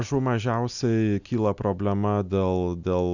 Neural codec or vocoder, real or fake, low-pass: none; real; 7.2 kHz